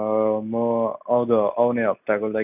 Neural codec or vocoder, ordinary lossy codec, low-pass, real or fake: none; none; 3.6 kHz; real